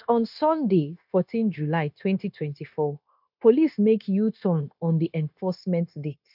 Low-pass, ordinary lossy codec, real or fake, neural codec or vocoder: 5.4 kHz; none; fake; codec, 16 kHz, 0.9 kbps, LongCat-Audio-Codec